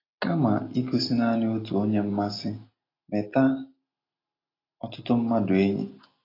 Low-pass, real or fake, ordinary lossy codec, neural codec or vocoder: 5.4 kHz; real; AAC, 24 kbps; none